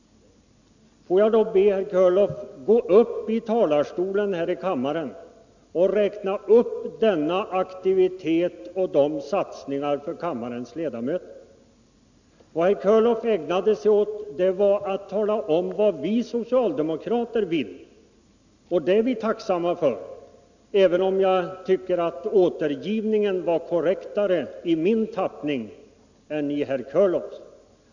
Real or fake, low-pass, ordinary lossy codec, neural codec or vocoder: real; 7.2 kHz; none; none